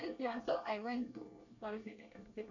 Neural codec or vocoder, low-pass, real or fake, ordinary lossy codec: codec, 24 kHz, 1 kbps, SNAC; 7.2 kHz; fake; none